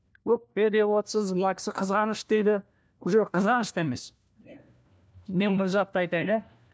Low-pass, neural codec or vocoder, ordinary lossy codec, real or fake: none; codec, 16 kHz, 1 kbps, FunCodec, trained on LibriTTS, 50 frames a second; none; fake